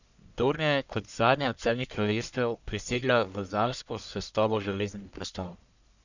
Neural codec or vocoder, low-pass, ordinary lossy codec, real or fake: codec, 44.1 kHz, 1.7 kbps, Pupu-Codec; 7.2 kHz; none; fake